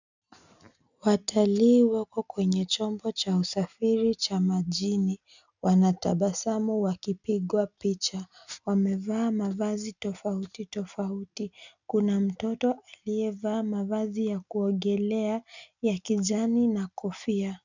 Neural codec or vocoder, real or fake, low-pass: none; real; 7.2 kHz